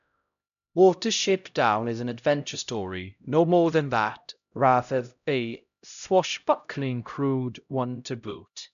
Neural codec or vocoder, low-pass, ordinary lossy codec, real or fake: codec, 16 kHz, 0.5 kbps, X-Codec, HuBERT features, trained on LibriSpeech; 7.2 kHz; none; fake